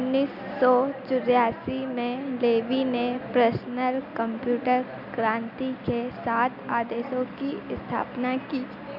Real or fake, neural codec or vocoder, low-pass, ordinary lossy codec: real; none; 5.4 kHz; none